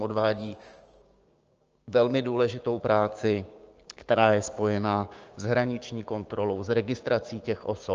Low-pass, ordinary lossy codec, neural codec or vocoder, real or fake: 7.2 kHz; Opus, 24 kbps; codec, 16 kHz, 6 kbps, DAC; fake